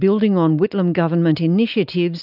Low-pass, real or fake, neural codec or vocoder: 5.4 kHz; real; none